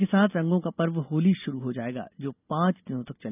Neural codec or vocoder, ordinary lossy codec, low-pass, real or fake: none; none; 3.6 kHz; real